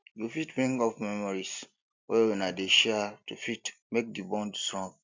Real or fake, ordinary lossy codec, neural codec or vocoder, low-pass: real; MP3, 64 kbps; none; 7.2 kHz